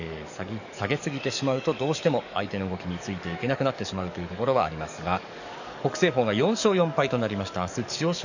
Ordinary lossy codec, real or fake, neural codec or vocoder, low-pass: none; fake; codec, 44.1 kHz, 7.8 kbps, DAC; 7.2 kHz